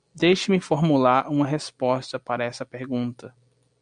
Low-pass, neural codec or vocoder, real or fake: 9.9 kHz; none; real